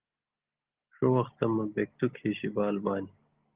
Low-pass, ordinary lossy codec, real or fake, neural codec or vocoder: 3.6 kHz; Opus, 16 kbps; real; none